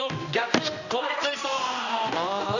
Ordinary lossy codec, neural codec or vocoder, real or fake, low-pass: none; codec, 16 kHz, 1 kbps, X-Codec, HuBERT features, trained on general audio; fake; 7.2 kHz